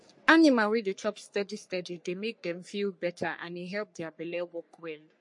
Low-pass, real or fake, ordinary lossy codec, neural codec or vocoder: 10.8 kHz; fake; MP3, 48 kbps; codec, 44.1 kHz, 3.4 kbps, Pupu-Codec